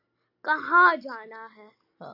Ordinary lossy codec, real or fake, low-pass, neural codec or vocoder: AAC, 48 kbps; real; 5.4 kHz; none